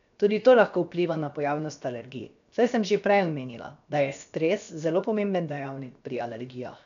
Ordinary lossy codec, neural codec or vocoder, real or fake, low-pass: none; codec, 16 kHz, 0.7 kbps, FocalCodec; fake; 7.2 kHz